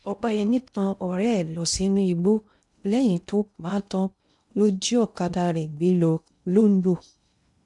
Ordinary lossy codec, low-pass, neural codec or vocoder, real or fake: none; 10.8 kHz; codec, 16 kHz in and 24 kHz out, 0.6 kbps, FocalCodec, streaming, 2048 codes; fake